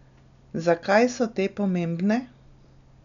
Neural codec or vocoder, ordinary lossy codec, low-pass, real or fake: none; none; 7.2 kHz; real